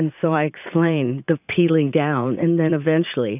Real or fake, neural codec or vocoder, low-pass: fake; vocoder, 44.1 kHz, 80 mel bands, Vocos; 3.6 kHz